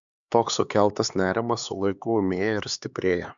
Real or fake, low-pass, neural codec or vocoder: fake; 7.2 kHz; codec, 16 kHz, 4 kbps, X-Codec, HuBERT features, trained on LibriSpeech